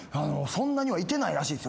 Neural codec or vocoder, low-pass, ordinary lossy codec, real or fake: none; none; none; real